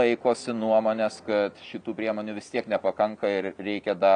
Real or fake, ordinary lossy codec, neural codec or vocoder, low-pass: real; MP3, 96 kbps; none; 9.9 kHz